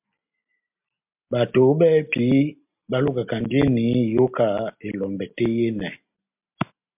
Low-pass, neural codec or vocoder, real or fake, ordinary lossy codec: 3.6 kHz; none; real; MP3, 32 kbps